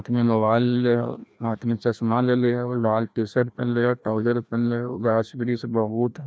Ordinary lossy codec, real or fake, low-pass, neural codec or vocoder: none; fake; none; codec, 16 kHz, 1 kbps, FreqCodec, larger model